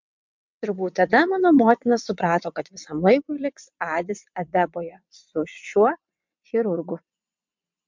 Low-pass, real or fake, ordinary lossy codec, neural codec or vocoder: 7.2 kHz; fake; MP3, 64 kbps; vocoder, 22.05 kHz, 80 mel bands, WaveNeXt